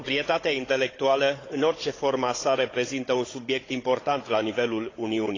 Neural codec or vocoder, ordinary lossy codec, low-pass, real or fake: codec, 16 kHz, 16 kbps, FunCodec, trained on Chinese and English, 50 frames a second; AAC, 32 kbps; 7.2 kHz; fake